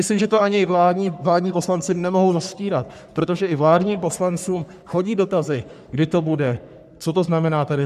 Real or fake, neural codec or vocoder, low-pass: fake; codec, 44.1 kHz, 3.4 kbps, Pupu-Codec; 14.4 kHz